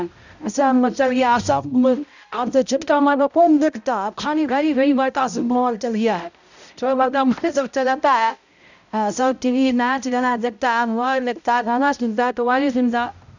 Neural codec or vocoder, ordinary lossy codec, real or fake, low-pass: codec, 16 kHz, 0.5 kbps, X-Codec, HuBERT features, trained on balanced general audio; none; fake; 7.2 kHz